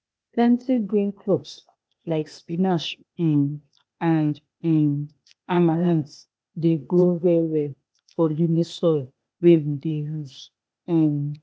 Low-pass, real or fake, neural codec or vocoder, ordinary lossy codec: none; fake; codec, 16 kHz, 0.8 kbps, ZipCodec; none